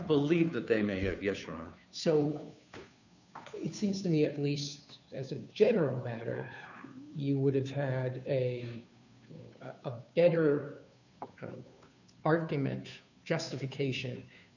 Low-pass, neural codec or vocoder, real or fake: 7.2 kHz; codec, 16 kHz, 2 kbps, FunCodec, trained on Chinese and English, 25 frames a second; fake